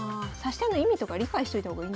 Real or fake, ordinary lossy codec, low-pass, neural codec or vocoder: real; none; none; none